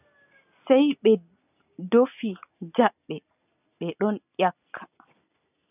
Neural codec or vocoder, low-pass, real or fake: none; 3.6 kHz; real